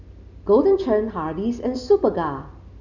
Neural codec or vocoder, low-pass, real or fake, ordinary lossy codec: none; 7.2 kHz; real; Opus, 64 kbps